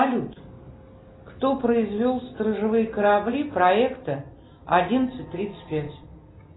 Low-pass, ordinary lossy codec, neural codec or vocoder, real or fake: 7.2 kHz; AAC, 16 kbps; none; real